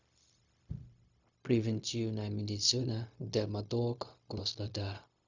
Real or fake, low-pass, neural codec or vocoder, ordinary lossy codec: fake; 7.2 kHz; codec, 16 kHz, 0.4 kbps, LongCat-Audio-Codec; Opus, 64 kbps